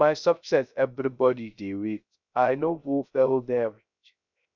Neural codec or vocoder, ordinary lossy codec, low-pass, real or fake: codec, 16 kHz, 0.3 kbps, FocalCodec; none; 7.2 kHz; fake